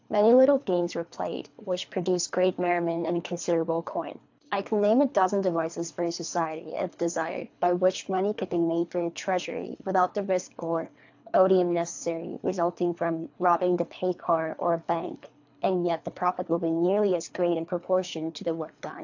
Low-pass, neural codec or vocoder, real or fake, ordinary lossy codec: 7.2 kHz; codec, 24 kHz, 3 kbps, HILCodec; fake; AAC, 48 kbps